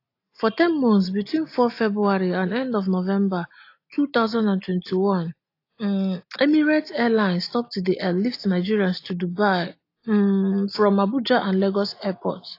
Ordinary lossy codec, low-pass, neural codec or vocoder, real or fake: AAC, 32 kbps; 5.4 kHz; none; real